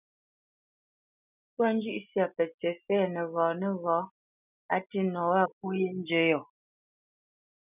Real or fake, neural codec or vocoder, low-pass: real; none; 3.6 kHz